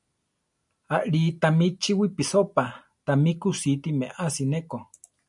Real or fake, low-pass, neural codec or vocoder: real; 10.8 kHz; none